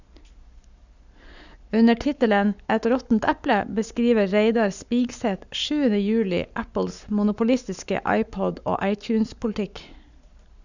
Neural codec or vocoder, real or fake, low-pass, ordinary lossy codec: codec, 44.1 kHz, 7.8 kbps, Pupu-Codec; fake; 7.2 kHz; none